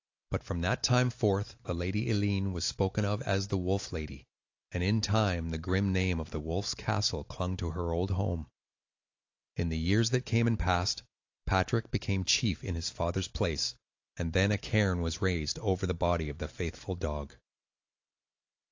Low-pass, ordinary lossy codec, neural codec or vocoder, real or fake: 7.2 kHz; AAC, 48 kbps; none; real